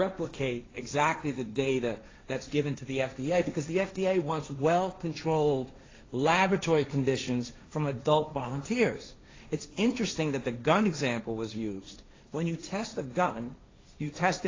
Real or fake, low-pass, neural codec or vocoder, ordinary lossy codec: fake; 7.2 kHz; codec, 16 kHz, 1.1 kbps, Voila-Tokenizer; AAC, 32 kbps